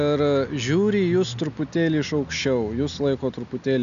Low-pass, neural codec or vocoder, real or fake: 7.2 kHz; none; real